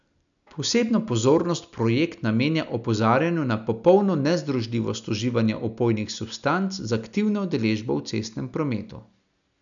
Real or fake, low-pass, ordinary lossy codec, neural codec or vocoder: real; 7.2 kHz; none; none